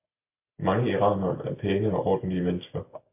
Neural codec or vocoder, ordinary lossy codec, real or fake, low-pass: none; MP3, 24 kbps; real; 3.6 kHz